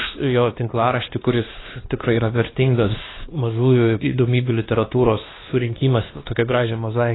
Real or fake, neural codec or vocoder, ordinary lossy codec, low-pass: fake; codec, 16 kHz, 2 kbps, X-Codec, WavLM features, trained on Multilingual LibriSpeech; AAC, 16 kbps; 7.2 kHz